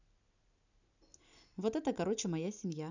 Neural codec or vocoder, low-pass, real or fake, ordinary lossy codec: none; 7.2 kHz; real; none